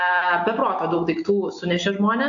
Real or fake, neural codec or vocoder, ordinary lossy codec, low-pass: real; none; AAC, 64 kbps; 7.2 kHz